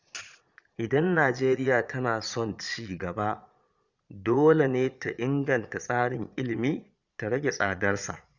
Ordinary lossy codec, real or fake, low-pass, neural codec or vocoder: Opus, 64 kbps; fake; 7.2 kHz; vocoder, 22.05 kHz, 80 mel bands, Vocos